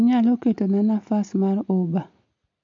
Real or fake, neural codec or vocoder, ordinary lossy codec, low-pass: real; none; MP3, 48 kbps; 7.2 kHz